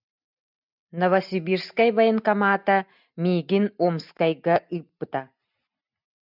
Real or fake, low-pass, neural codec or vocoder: real; 5.4 kHz; none